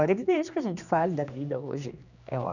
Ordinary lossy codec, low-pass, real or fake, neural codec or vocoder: none; 7.2 kHz; fake; codec, 16 kHz, 2 kbps, X-Codec, HuBERT features, trained on general audio